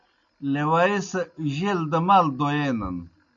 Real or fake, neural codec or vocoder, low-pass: real; none; 7.2 kHz